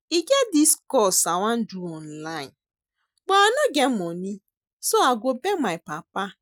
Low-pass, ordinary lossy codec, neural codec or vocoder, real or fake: none; none; none; real